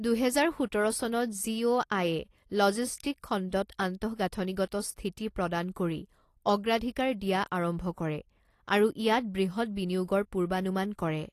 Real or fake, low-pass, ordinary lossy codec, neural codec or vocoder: real; 14.4 kHz; AAC, 48 kbps; none